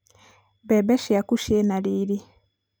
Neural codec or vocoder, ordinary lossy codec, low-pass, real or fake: none; none; none; real